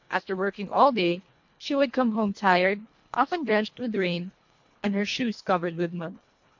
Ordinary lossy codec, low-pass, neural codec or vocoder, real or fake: MP3, 48 kbps; 7.2 kHz; codec, 24 kHz, 1.5 kbps, HILCodec; fake